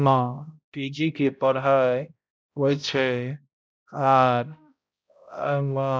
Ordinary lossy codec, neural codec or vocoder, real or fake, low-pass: none; codec, 16 kHz, 0.5 kbps, X-Codec, HuBERT features, trained on balanced general audio; fake; none